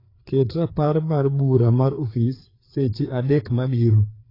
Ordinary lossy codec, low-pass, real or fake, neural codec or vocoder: AAC, 24 kbps; 5.4 kHz; fake; codec, 16 kHz, 4 kbps, FreqCodec, larger model